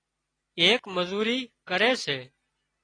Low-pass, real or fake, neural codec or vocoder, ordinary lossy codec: 9.9 kHz; real; none; AAC, 32 kbps